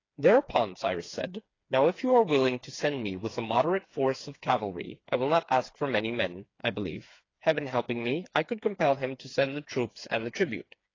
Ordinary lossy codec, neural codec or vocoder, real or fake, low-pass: AAC, 32 kbps; codec, 16 kHz, 4 kbps, FreqCodec, smaller model; fake; 7.2 kHz